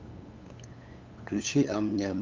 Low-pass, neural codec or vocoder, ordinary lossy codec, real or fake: 7.2 kHz; codec, 16 kHz, 8 kbps, FunCodec, trained on LibriTTS, 25 frames a second; Opus, 24 kbps; fake